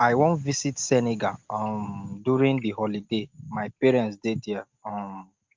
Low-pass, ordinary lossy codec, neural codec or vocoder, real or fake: 7.2 kHz; Opus, 24 kbps; none; real